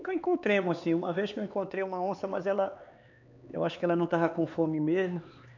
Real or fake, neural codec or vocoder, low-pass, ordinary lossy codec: fake; codec, 16 kHz, 4 kbps, X-Codec, HuBERT features, trained on LibriSpeech; 7.2 kHz; none